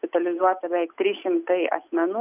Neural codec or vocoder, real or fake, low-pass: none; real; 3.6 kHz